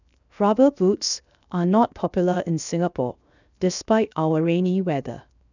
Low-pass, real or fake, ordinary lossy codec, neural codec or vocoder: 7.2 kHz; fake; none; codec, 16 kHz, 0.7 kbps, FocalCodec